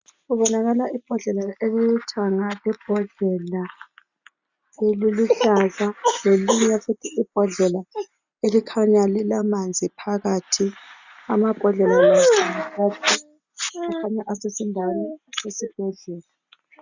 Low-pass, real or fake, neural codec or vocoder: 7.2 kHz; real; none